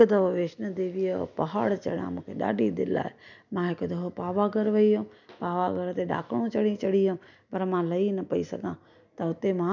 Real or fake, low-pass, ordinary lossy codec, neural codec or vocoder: real; 7.2 kHz; none; none